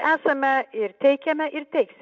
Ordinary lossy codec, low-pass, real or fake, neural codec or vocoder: MP3, 64 kbps; 7.2 kHz; real; none